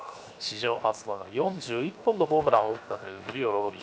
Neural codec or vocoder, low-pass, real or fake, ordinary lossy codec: codec, 16 kHz, 0.7 kbps, FocalCodec; none; fake; none